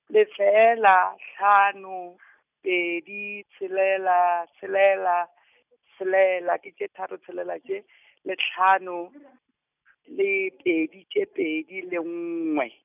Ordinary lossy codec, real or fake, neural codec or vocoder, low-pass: none; real; none; 3.6 kHz